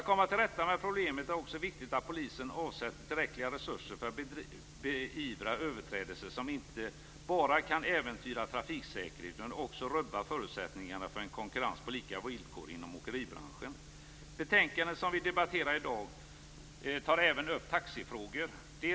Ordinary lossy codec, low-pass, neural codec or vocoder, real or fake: none; none; none; real